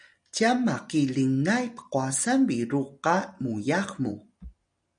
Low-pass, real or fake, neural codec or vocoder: 9.9 kHz; real; none